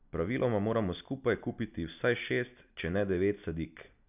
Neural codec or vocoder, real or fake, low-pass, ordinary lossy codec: none; real; 3.6 kHz; none